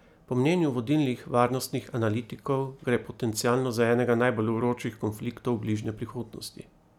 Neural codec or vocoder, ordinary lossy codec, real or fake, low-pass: none; none; real; 19.8 kHz